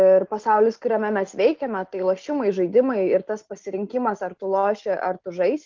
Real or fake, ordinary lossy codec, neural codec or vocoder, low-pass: real; Opus, 24 kbps; none; 7.2 kHz